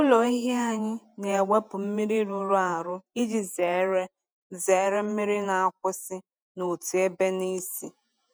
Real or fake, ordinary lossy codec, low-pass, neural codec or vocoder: fake; none; none; vocoder, 48 kHz, 128 mel bands, Vocos